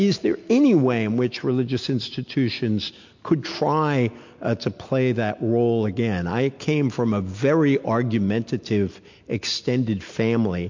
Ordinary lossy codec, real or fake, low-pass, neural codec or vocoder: MP3, 48 kbps; real; 7.2 kHz; none